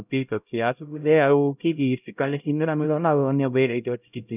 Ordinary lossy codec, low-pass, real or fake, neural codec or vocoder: none; 3.6 kHz; fake; codec, 16 kHz, 0.5 kbps, X-Codec, HuBERT features, trained on LibriSpeech